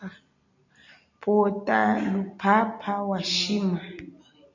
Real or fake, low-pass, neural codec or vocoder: real; 7.2 kHz; none